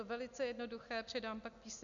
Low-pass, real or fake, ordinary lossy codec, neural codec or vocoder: 7.2 kHz; real; MP3, 96 kbps; none